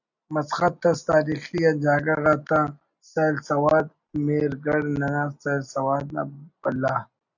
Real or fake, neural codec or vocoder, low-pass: real; none; 7.2 kHz